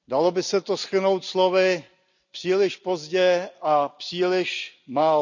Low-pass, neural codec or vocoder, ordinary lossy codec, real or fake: 7.2 kHz; none; none; real